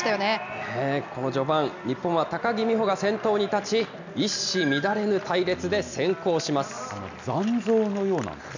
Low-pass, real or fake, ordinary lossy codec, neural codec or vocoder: 7.2 kHz; real; none; none